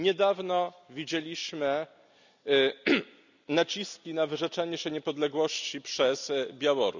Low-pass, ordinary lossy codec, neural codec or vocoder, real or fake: 7.2 kHz; none; none; real